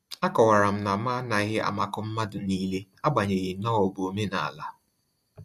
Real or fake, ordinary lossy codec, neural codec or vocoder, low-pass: real; MP3, 64 kbps; none; 14.4 kHz